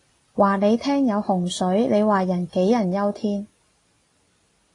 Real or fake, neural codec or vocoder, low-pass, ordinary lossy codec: real; none; 10.8 kHz; AAC, 32 kbps